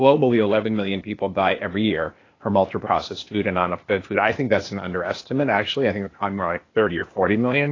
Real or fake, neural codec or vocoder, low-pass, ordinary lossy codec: fake; codec, 16 kHz, 0.8 kbps, ZipCodec; 7.2 kHz; AAC, 32 kbps